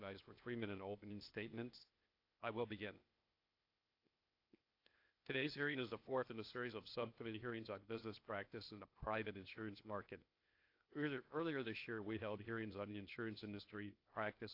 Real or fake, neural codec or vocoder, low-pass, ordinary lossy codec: fake; codec, 16 kHz, 0.8 kbps, ZipCodec; 5.4 kHz; AAC, 32 kbps